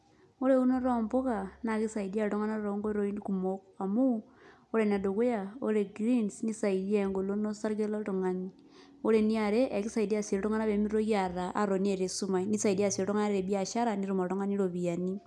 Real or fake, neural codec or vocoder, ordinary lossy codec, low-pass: real; none; none; none